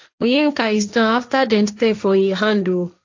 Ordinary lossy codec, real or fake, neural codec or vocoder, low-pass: AAC, 48 kbps; fake; codec, 16 kHz, 1.1 kbps, Voila-Tokenizer; 7.2 kHz